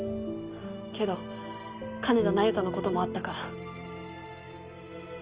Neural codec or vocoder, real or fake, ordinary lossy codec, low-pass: none; real; Opus, 32 kbps; 3.6 kHz